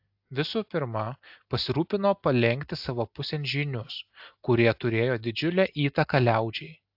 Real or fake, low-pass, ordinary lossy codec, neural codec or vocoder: real; 5.4 kHz; AAC, 48 kbps; none